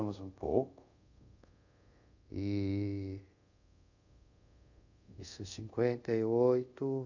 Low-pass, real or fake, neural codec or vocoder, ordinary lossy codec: 7.2 kHz; fake; codec, 24 kHz, 0.5 kbps, DualCodec; none